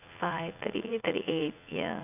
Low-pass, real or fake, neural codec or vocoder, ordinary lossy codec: 3.6 kHz; fake; vocoder, 44.1 kHz, 80 mel bands, Vocos; none